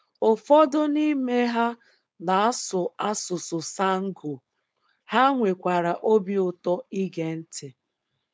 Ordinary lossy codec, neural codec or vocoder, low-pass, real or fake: none; codec, 16 kHz, 4.8 kbps, FACodec; none; fake